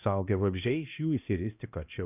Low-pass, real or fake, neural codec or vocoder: 3.6 kHz; fake; codec, 16 kHz, 1 kbps, X-Codec, HuBERT features, trained on balanced general audio